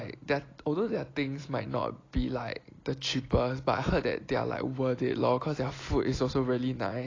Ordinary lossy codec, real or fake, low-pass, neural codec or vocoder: AAC, 32 kbps; real; 7.2 kHz; none